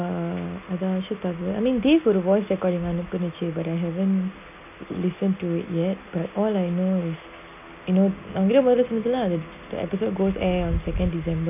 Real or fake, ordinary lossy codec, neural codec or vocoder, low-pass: real; none; none; 3.6 kHz